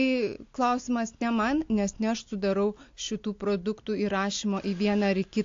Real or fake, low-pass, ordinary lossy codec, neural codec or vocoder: real; 7.2 kHz; AAC, 48 kbps; none